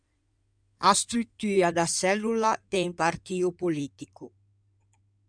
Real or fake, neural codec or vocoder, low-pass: fake; codec, 16 kHz in and 24 kHz out, 2.2 kbps, FireRedTTS-2 codec; 9.9 kHz